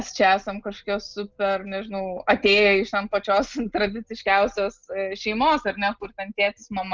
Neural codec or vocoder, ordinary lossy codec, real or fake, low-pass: none; Opus, 24 kbps; real; 7.2 kHz